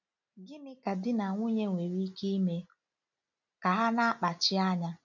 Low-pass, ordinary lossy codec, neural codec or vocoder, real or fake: 7.2 kHz; none; none; real